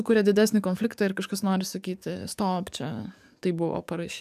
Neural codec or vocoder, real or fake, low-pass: codec, 44.1 kHz, 7.8 kbps, DAC; fake; 14.4 kHz